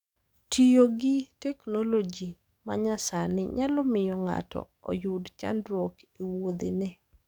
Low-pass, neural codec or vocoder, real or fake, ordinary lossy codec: 19.8 kHz; codec, 44.1 kHz, 7.8 kbps, DAC; fake; none